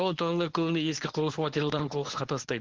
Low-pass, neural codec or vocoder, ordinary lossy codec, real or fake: 7.2 kHz; codec, 24 kHz, 0.9 kbps, WavTokenizer, medium speech release version 1; Opus, 16 kbps; fake